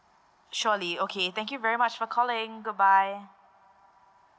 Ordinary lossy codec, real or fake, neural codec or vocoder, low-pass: none; real; none; none